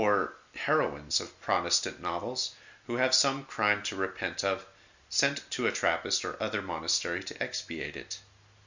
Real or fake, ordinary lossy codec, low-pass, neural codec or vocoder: real; Opus, 64 kbps; 7.2 kHz; none